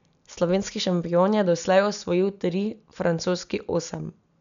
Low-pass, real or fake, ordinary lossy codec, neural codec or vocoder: 7.2 kHz; real; none; none